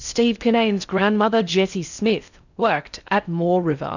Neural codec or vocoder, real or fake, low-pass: codec, 16 kHz in and 24 kHz out, 0.6 kbps, FocalCodec, streaming, 4096 codes; fake; 7.2 kHz